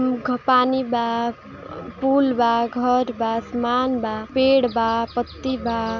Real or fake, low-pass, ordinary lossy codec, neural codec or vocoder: real; 7.2 kHz; none; none